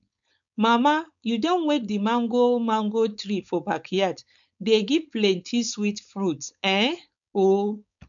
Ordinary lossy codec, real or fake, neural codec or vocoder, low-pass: none; fake; codec, 16 kHz, 4.8 kbps, FACodec; 7.2 kHz